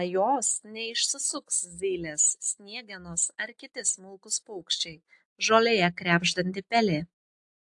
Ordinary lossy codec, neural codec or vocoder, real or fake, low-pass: AAC, 64 kbps; none; real; 10.8 kHz